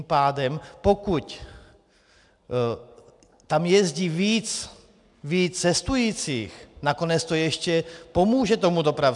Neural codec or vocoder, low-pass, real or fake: none; 10.8 kHz; real